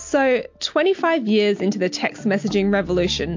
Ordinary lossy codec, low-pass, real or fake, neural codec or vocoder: MP3, 48 kbps; 7.2 kHz; real; none